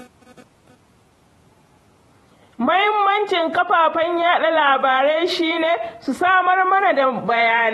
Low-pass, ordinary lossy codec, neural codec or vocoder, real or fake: 19.8 kHz; AAC, 32 kbps; vocoder, 48 kHz, 128 mel bands, Vocos; fake